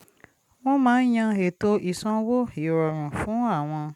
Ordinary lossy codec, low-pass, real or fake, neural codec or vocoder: none; 19.8 kHz; real; none